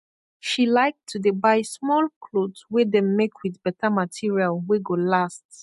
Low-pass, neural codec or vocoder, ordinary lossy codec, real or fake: 14.4 kHz; none; MP3, 48 kbps; real